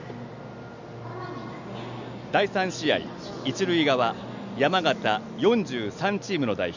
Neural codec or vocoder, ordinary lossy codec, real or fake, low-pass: none; none; real; 7.2 kHz